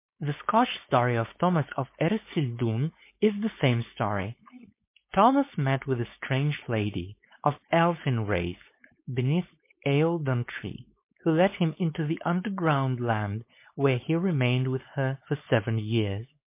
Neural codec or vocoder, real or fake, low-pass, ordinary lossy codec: codec, 16 kHz, 4.8 kbps, FACodec; fake; 3.6 kHz; MP3, 24 kbps